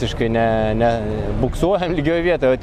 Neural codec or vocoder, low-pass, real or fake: none; 14.4 kHz; real